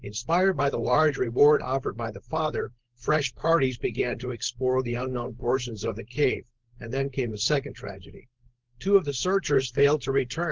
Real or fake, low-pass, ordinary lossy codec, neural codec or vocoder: fake; 7.2 kHz; Opus, 16 kbps; codec, 16 kHz, 4.8 kbps, FACodec